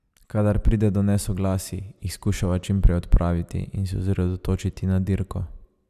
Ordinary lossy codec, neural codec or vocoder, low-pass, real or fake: none; none; 14.4 kHz; real